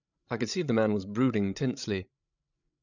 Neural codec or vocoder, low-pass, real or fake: codec, 16 kHz, 16 kbps, FreqCodec, larger model; 7.2 kHz; fake